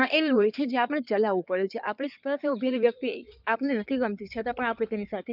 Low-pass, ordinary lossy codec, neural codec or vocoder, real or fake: 5.4 kHz; none; codec, 16 kHz in and 24 kHz out, 2.2 kbps, FireRedTTS-2 codec; fake